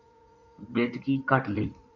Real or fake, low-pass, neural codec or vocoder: fake; 7.2 kHz; codec, 16 kHz in and 24 kHz out, 2.2 kbps, FireRedTTS-2 codec